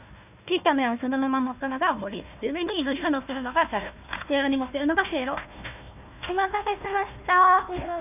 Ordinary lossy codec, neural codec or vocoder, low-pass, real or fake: none; codec, 16 kHz, 1 kbps, FunCodec, trained on Chinese and English, 50 frames a second; 3.6 kHz; fake